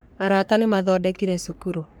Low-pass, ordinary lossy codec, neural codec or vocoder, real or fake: none; none; codec, 44.1 kHz, 3.4 kbps, Pupu-Codec; fake